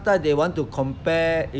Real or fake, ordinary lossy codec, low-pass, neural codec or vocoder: real; none; none; none